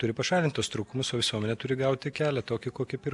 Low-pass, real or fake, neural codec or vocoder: 10.8 kHz; real; none